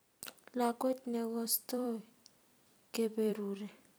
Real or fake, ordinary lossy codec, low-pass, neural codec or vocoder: fake; none; none; vocoder, 44.1 kHz, 128 mel bands every 256 samples, BigVGAN v2